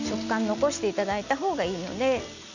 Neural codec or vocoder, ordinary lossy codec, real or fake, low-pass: none; none; real; 7.2 kHz